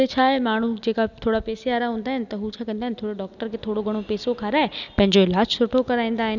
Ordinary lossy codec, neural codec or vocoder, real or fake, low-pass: none; none; real; 7.2 kHz